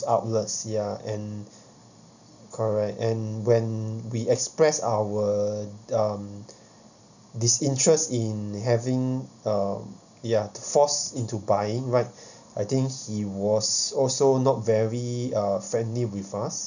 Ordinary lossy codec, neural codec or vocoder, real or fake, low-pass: none; none; real; 7.2 kHz